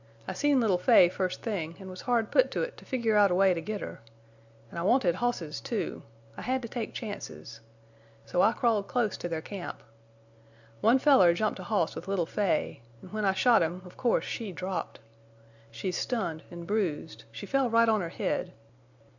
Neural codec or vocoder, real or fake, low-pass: none; real; 7.2 kHz